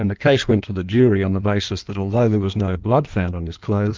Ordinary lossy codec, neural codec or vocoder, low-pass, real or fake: Opus, 32 kbps; codec, 16 kHz in and 24 kHz out, 1.1 kbps, FireRedTTS-2 codec; 7.2 kHz; fake